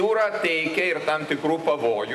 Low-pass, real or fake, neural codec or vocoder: 14.4 kHz; real; none